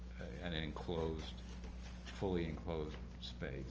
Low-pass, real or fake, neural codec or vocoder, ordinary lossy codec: 7.2 kHz; real; none; Opus, 24 kbps